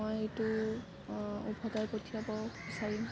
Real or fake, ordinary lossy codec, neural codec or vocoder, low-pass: real; none; none; none